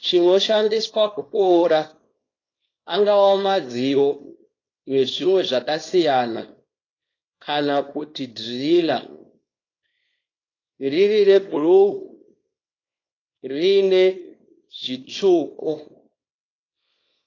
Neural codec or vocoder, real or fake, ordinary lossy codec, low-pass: codec, 24 kHz, 0.9 kbps, WavTokenizer, small release; fake; AAC, 32 kbps; 7.2 kHz